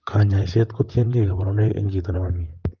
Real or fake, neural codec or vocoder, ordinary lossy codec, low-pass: fake; vocoder, 44.1 kHz, 128 mel bands, Pupu-Vocoder; Opus, 24 kbps; 7.2 kHz